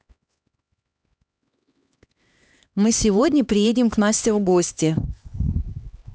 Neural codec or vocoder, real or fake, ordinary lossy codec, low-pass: codec, 16 kHz, 2 kbps, X-Codec, HuBERT features, trained on LibriSpeech; fake; none; none